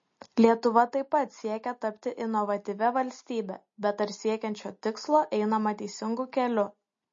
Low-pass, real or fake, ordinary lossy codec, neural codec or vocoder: 7.2 kHz; real; MP3, 32 kbps; none